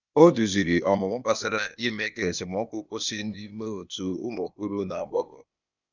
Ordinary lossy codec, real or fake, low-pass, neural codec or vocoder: none; fake; 7.2 kHz; codec, 16 kHz, 0.8 kbps, ZipCodec